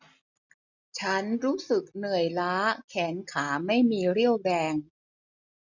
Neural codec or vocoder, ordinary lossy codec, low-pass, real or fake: none; none; 7.2 kHz; real